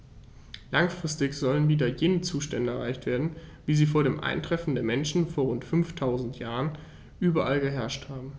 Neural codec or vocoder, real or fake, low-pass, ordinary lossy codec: none; real; none; none